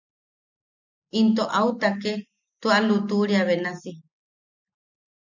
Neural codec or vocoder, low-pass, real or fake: none; 7.2 kHz; real